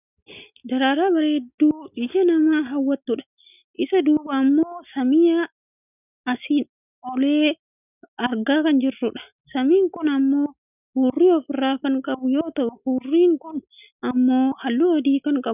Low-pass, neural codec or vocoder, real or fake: 3.6 kHz; none; real